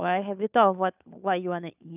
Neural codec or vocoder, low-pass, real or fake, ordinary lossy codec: codec, 16 kHz, 2 kbps, FunCodec, trained on Chinese and English, 25 frames a second; 3.6 kHz; fake; none